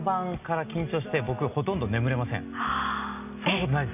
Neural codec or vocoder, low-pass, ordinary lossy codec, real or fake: none; 3.6 kHz; none; real